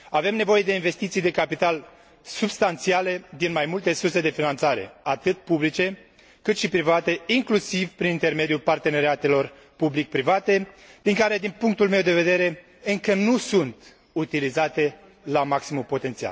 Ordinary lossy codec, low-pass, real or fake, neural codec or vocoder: none; none; real; none